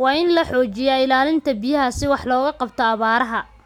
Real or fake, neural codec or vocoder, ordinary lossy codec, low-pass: real; none; none; 19.8 kHz